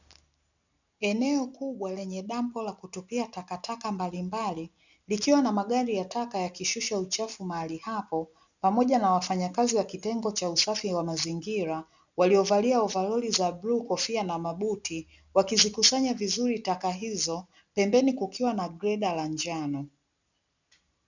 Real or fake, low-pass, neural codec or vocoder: real; 7.2 kHz; none